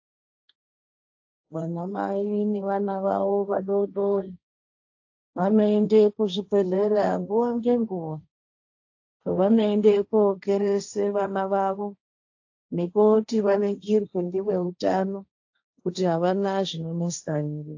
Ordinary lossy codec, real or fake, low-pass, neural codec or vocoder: AAC, 48 kbps; fake; 7.2 kHz; codec, 16 kHz, 1.1 kbps, Voila-Tokenizer